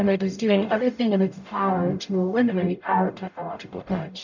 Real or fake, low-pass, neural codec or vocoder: fake; 7.2 kHz; codec, 44.1 kHz, 0.9 kbps, DAC